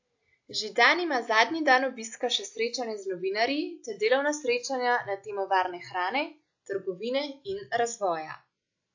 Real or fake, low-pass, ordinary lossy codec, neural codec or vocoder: real; 7.2 kHz; AAC, 48 kbps; none